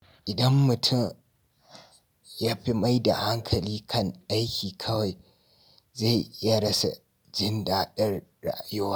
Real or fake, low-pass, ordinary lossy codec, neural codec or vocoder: real; none; none; none